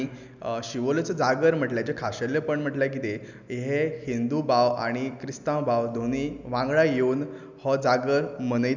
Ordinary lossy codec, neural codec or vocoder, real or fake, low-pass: none; none; real; 7.2 kHz